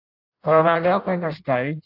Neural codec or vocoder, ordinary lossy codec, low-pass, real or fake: codec, 16 kHz in and 24 kHz out, 0.6 kbps, FireRedTTS-2 codec; AAC, 48 kbps; 5.4 kHz; fake